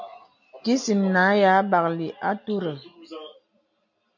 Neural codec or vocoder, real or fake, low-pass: none; real; 7.2 kHz